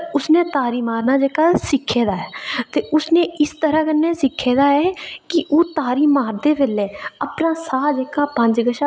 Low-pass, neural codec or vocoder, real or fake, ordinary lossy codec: none; none; real; none